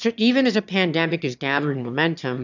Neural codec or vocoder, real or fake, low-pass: autoencoder, 22.05 kHz, a latent of 192 numbers a frame, VITS, trained on one speaker; fake; 7.2 kHz